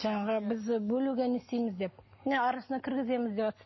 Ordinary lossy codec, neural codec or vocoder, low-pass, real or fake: MP3, 24 kbps; none; 7.2 kHz; real